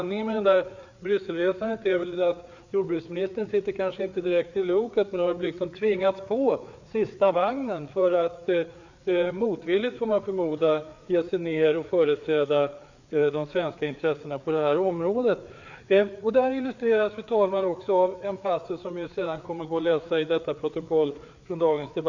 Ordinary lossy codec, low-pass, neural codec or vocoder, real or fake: none; 7.2 kHz; codec, 16 kHz, 4 kbps, FreqCodec, larger model; fake